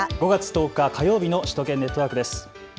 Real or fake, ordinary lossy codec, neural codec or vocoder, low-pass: real; none; none; none